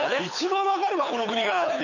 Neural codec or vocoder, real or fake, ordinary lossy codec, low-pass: codec, 24 kHz, 6 kbps, HILCodec; fake; none; 7.2 kHz